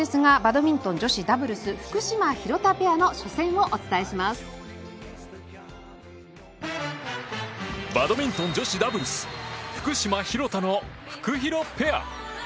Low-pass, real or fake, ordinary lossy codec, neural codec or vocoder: none; real; none; none